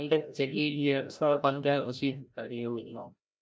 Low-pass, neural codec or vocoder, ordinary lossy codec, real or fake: none; codec, 16 kHz, 0.5 kbps, FreqCodec, larger model; none; fake